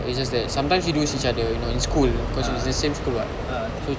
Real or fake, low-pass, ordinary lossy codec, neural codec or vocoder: real; none; none; none